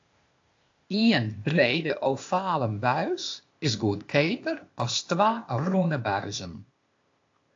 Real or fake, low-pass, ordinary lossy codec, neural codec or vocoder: fake; 7.2 kHz; AAC, 48 kbps; codec, 16 kHz, 0.8 kbps, ZipCodec